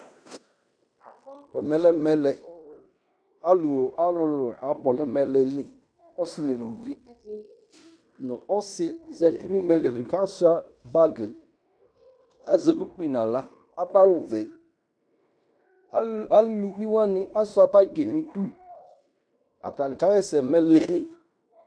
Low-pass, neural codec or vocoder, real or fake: 9.9 kHz; codec, 16 kHz in and 24 kHz out, 0.9 kbps, LongCat-Audio-Codec, fine tuned four codebook decoder; fake